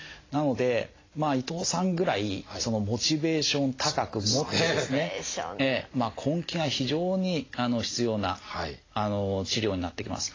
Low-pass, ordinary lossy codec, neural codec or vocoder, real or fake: 7.2 kHz; AAC, 32 kbps; none; real